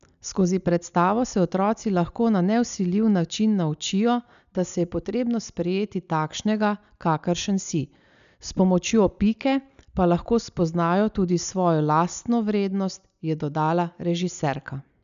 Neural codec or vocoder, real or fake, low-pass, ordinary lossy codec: none; real; 7.2 kHz; none